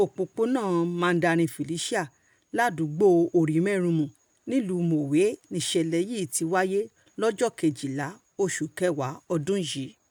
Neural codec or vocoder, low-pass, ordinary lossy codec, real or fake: none; none; none; real